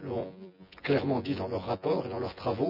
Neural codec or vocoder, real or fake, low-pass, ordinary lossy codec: vocoder, 24 kHz, 100 mel bands, Vocos; fake; 5.4 kHz; none